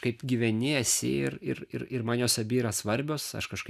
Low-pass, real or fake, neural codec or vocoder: 14.4 kHz; real; none